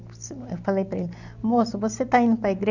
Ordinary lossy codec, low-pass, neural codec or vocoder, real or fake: none; 7.2 kHz; none; real